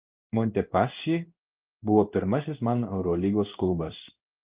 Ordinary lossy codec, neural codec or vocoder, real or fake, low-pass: Opus, 32 kbps; codec, 16 kHz in and 24 kHz out, 1 kbps, XY-Tokenizer; fake; 3.6 kHz